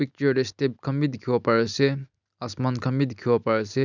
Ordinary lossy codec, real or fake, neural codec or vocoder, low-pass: none; real; none; 7.2 kHz